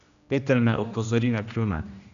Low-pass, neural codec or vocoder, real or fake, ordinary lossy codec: 7.2 kHz; codec, 16 kHz, 1 kbps, X-Codec, HuBERT features, trained on general audio; fake; none